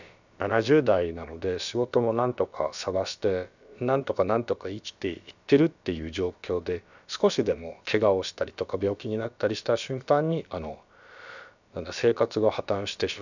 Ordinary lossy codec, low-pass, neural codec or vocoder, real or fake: none; 7.2 kHz; codec, 16 kHz, about 1 kbps, DyCAST, with the encoder's durations; fake